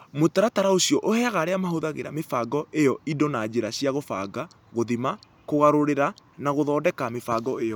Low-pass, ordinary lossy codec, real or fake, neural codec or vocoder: none; none; real; none